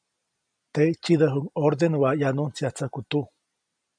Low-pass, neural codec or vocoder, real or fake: 9.9 kHz; none; real